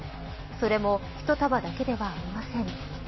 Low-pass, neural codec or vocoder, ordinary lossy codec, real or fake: 7.2 kHz; none; MP3, 24 kbps; real